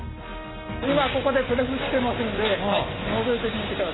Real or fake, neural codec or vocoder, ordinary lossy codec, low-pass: real; none; AAC, 16 kbps; 7.2 kHz